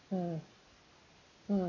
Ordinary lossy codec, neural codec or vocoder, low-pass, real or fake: none; none; 7.2 kHz; real